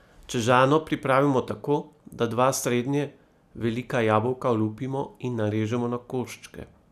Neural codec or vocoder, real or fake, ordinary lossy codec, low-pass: none; real; none; 14.4 kHz